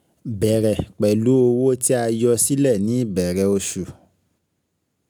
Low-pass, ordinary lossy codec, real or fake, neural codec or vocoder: none; none; real; none